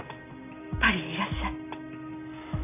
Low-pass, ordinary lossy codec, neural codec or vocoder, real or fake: 3.6 kHz; none; none; real